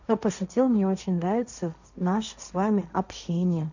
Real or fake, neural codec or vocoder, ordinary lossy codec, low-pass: fake; codec, 16 kHz, 1.1 kbps, Voila-Tokenizer; none; 7.2 kHz